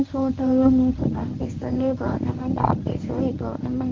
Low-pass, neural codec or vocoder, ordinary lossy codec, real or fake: 7.2 kHz; codec, 24 kHz, 0.9 kbps, WavTokenizer, medium speech release version 1; Opus, 32 kbps; fake